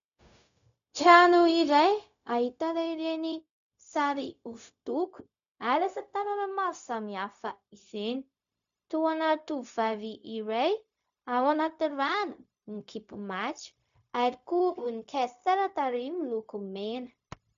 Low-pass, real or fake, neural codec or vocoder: 7.2 kHz; fake; codec, 16 kHz, 0.4 kbps, LongCat-Audio-Codec